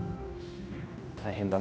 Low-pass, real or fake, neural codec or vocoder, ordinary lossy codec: none; fake; codec, 16 kHz, 1 kbps, X-Codec, HuBERT features, trained on general audio; none